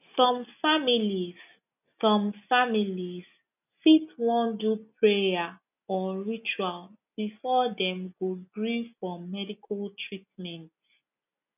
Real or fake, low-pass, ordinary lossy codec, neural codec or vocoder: real; 3.6 kHz; none; none